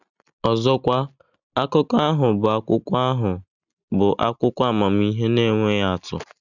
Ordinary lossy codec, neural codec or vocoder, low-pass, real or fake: none; none; 7.2 kHz; real